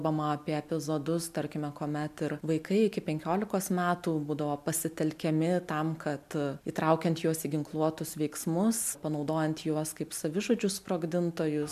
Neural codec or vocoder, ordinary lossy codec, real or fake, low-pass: none; MP3, 96 kbps; real; 14.4 kHz